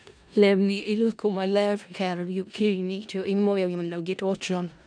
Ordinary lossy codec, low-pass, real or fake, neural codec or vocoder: none; 9.9 kHz; fake; codec, 16 kHz in and 24 kHz out, 0.4 kbps, LongCat-Audio-Codec, four codebook decoder